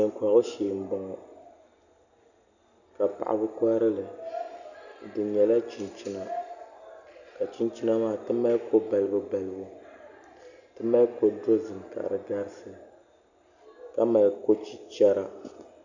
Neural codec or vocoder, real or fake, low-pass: none; real; 7.2 kHz